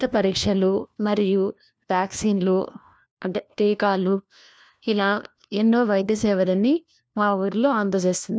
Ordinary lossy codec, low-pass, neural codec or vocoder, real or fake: none; none; codec, 16 kHz, 1 kbps, FunCodec, trained on LibriTTS, 50 frames a second; fake